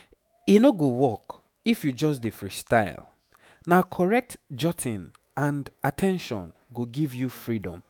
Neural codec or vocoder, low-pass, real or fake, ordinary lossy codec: autoencoder, 48 kHz, 128 numbers a frame, DAC-VAE, trained on Japanese speech; none; fake; none